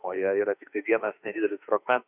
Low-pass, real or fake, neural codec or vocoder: 3.6 kHz; fake; autoencoder, 48 kHz, 32 numbers a frame, DAC-VAE, trained on Japanese speech